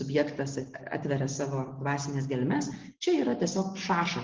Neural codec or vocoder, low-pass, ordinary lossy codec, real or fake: none; 7.2 kHz; Opus, 16 kbps; real